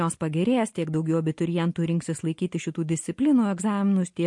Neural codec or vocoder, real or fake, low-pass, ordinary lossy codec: none; real; 10.8 kHz; MP3, 48 kbps